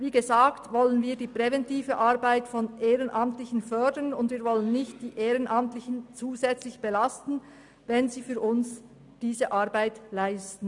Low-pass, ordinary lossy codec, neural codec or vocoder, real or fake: 10.8 kHz; none; none; real